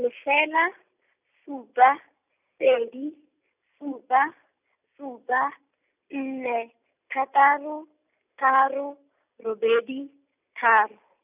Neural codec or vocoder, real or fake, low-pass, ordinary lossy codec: none; real; 3.6 kHz; none